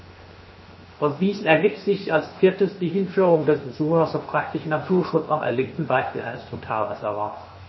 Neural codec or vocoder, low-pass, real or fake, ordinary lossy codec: codec, 16 kHz, 0.7 kbps, FocalCodec; 7.2 kHz; fake; MP3, 24 kbps